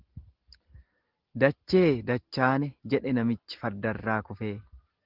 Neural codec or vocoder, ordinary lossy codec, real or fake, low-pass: none; Opus, 16 kbps; real; 5.4 kHz